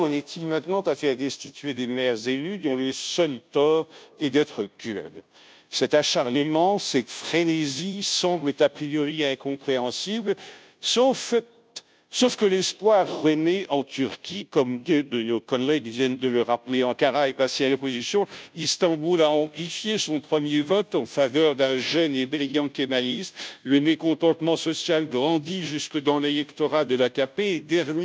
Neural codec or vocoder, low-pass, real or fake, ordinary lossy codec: codec, 16 kHz, 0.5 kbps, FunCodec, trained on Chinese and English, 25 frames a second; none; fake; none